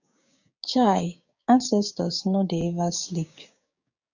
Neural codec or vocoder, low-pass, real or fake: codec, 16 kHz, 6 kbps, DAC; 7.2 kHz; fake